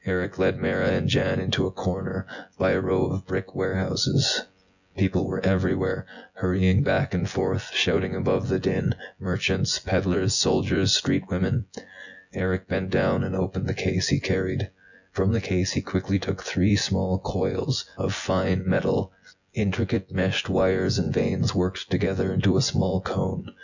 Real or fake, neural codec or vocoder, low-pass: fake; vocoder, 24 kHz, 100 mel bands, Vocos; 7.2 kHz